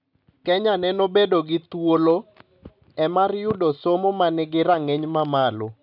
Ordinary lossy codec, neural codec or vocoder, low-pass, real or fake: none; none; 5.4 kHz; real